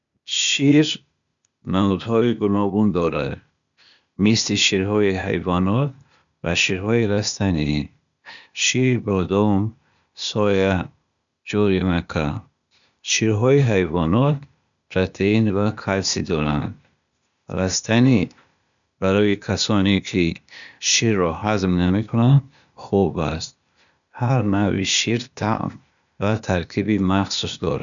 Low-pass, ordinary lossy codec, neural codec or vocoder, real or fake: 7.2 kHz; none; codec, 16 kHz, 0.8 kbps, ZipCodec; fake